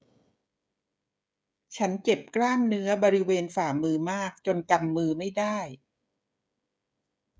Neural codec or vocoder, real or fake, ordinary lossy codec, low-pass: codec, 16 kHz, 16 kbps, FreqCodec, smaller model; fake; none; none